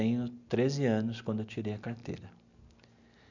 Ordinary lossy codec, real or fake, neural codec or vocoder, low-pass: none; real; none; 7.2 kHz